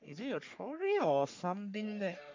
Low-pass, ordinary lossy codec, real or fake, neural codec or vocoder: 7.2 kHz; MP3, 64 kbps; fake; codec, 44.1 kHz, 3.4 kbps, Pupu-Codec